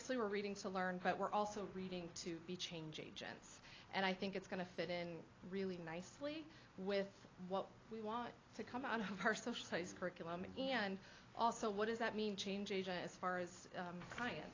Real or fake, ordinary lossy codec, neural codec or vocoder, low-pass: real; AAC, 32 kbps; none; 7.2 kHz